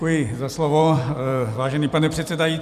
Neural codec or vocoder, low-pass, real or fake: vocoder, 44.1 kHz, 128 mel bands every 256 samples, BigVGAN v2; 14.4 kHz; fake